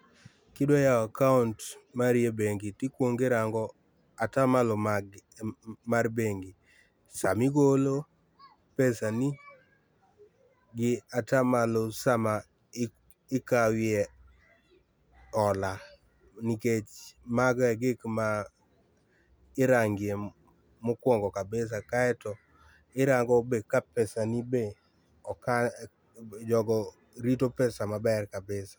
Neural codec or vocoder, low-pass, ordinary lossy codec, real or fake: none; none; none; real